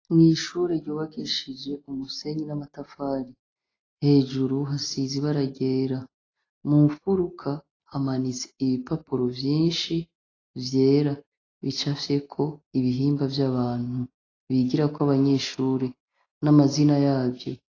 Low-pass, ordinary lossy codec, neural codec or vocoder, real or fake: 7.2 kHz; AAC, 32 kbps; none; real